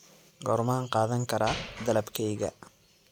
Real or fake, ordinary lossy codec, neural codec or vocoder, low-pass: real; none; none; 19.8 kHz